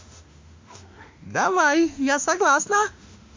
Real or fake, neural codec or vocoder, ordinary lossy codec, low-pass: fake; autoencoder, 48 kHz, 32 numbers a frame, DAC-VAE, trained on Japanese speech; MP3, 48 kbps; 7.2 kHz